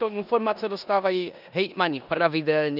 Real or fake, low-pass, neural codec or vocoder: fake; 5.4 kHz; codec, 16 kHz in and 24 kHz out, 0.9 kbps, LongCat-Audio-Codec, four codebook decoder